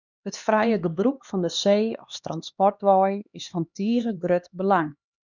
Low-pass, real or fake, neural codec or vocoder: 7.2 kHz; fake; codec, 16 kHz, 2 kbps, X-Codec, HuBERT features, trained on LibriSpeech